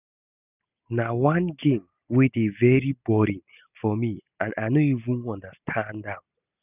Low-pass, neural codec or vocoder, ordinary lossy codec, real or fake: 3.6 kHz; none; none; real